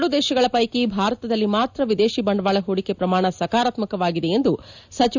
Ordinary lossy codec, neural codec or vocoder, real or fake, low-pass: none; none; real; 7.2 kHz